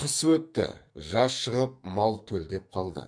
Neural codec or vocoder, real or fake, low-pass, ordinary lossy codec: codec, 44.1 kHz, 2.6 kbps, SNAC; fake; 9.9 kHz; MP3, 64 kbps